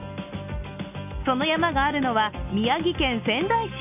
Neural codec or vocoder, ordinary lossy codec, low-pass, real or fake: none; none; 3.6 kHz; real